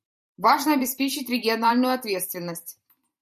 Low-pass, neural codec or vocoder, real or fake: 14.4 kHz; vocoder, 44.1 kHz, 128 mel bands every 512 samples, BigVGAN v2; fake